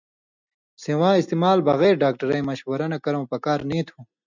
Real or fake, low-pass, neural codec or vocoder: real; 7.2 kHz; none